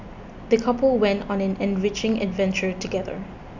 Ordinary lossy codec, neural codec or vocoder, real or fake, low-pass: none; none; real; 7.2 kHz